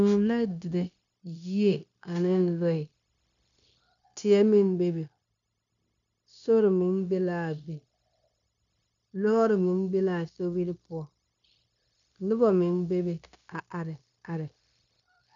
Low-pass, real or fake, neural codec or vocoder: 7.2 kHz; fake; codec, 16 kHz, 0.9 kbps, LongCat-Audio-Codec